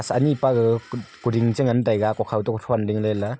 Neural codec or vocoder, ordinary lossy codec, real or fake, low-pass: none; none; real; none